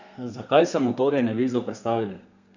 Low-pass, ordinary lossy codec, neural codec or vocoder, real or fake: 7.2 kHz; none; codec, 32 kHz, 1.9 kbps, SNAC; fake